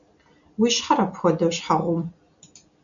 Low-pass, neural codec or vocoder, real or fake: 7.2 kHz; none; real